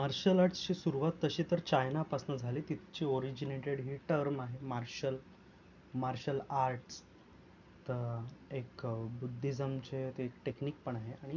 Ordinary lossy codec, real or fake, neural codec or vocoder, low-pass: none; real; none; 7.2 kHz